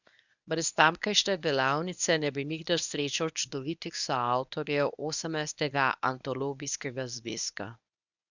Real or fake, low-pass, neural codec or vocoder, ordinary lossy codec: fake; 7.2 kHz; codec, 24 kHz, 0.9 kbps, WavTokenizer, medium speech release version 1; none